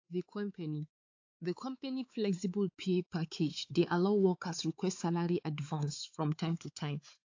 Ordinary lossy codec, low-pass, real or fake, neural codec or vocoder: AAC, 48 kbps; 7.2 kHz; fake; codec, 16 kHz, 4 kbps, X-Codec, WavLM features, trained on Multilingual LibriSpeech